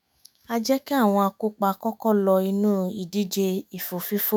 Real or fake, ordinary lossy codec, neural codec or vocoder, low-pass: fake; none; autoencoder, 48 kHz, 128 numbers a frame, DAC-VAE, trained on Japanese speech; none